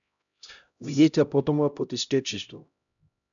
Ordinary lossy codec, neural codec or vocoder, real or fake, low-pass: MP3, 96 kbps; codec, 16 kHz, 0.5 kbps, X-Codec, HuBERT features, trained on LibriSpeech; fake; 7.2 kHz